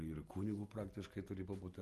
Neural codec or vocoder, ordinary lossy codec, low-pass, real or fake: none; Opus, 24 kbps; 14.4 kHz; real